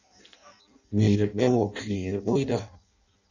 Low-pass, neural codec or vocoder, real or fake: 7.2 kHz; codec, 16 kHz in and 24 kHz out, 0.6 kbps, FireRedTTS-2 codec; fake